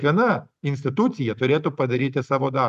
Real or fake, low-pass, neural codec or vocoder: real; 14.4 kHz; none